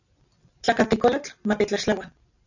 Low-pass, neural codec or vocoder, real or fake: 7.2 kHz; none; real